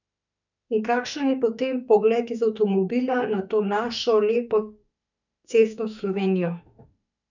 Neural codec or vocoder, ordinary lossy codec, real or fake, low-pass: autoencoder, 48 kHz, 32 numbers a frame, DAC-VAE, trained on Japanese speech; none; fake; 7.2 kHz